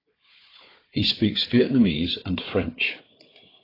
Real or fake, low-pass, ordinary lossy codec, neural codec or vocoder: fake; 5.4 kHz; AAC, 24 kbps; codec, 16 kHz, 4 kbps, FunCodec, trained on Chinese and English, 50 frames a second